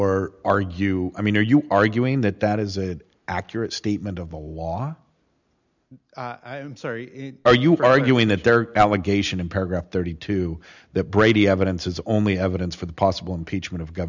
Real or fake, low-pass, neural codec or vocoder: real; 7.2 kHz; none